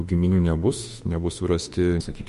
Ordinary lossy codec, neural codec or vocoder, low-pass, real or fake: MP3, 48 kbps; autoencoder, 48 kHz, 32 numbers a frame, DAC-VAE, trained on Japanese speech; 14.4 kHz; fake